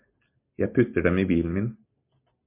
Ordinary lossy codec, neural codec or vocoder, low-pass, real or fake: MP3, 32 kbps; none; 3.6 kHz; real